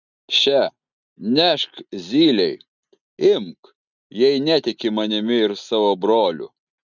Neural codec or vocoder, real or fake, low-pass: none; real; 7.2 kHz